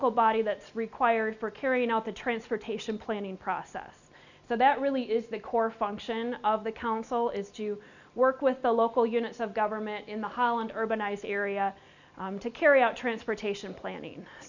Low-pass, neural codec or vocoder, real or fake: 7.2 kHz; none; real